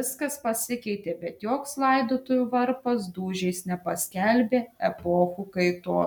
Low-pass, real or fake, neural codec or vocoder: 19.8 kHz; real; none